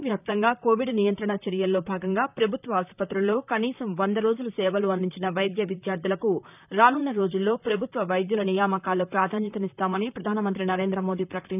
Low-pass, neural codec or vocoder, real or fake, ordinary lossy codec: 3.6 kHz; vocoder, 44.1 kHz, 128 mel bands, Pupu-Vocoder; fake; none